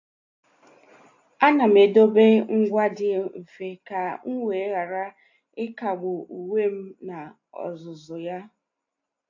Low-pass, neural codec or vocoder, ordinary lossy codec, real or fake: 7.2 kHz; none; AAC, 48 kbps; real